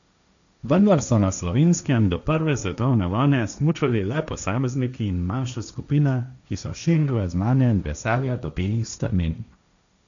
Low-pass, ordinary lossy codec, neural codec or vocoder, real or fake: 7.2 kHz; none; codec, 16 kHz, 1.1 kbps, Voila-Tokenizer; fake